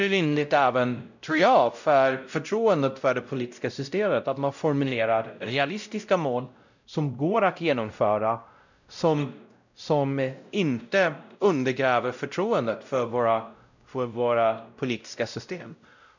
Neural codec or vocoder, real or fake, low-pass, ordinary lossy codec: codec, 16 kHz, 0.5 kbps, X-Codec, WavLM features, trained on Multilingual LibriSpeech; fake; 7.2 kHz; none